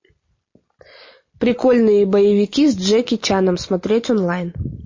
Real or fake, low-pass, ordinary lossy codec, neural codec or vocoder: real; 7.2 kHz; MP3, 32 kbps; none